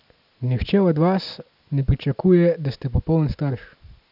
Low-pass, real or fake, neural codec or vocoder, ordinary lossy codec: 5.4 kHz; real; none; none